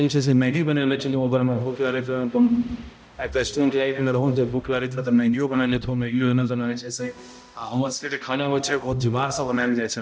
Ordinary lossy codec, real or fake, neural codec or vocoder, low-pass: none; fake; codec, 16 kHz, 0.5 kbps, X-Codec, HuBERT features, trained on balanced general audio; none